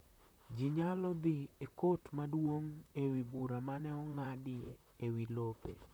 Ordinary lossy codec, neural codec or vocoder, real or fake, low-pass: none; vocoder, 44.1 kHz, 128 mel bands, Pupu-Vocoder; fake; none